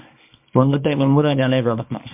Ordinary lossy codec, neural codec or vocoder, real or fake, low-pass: MP3, 32 kbps; codec, 24 kHz, 0.9 kbps, WavTokenizer, small release; fake; 3.6 kHz